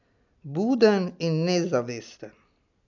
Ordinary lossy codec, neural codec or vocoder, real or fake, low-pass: none; none; real; 7.2 kHz